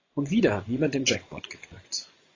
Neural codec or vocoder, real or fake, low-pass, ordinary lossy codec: vocoder, 24 kHz, 100 mel bands, Vocos; fake; 7.2 kHz; AAC, 32 kbps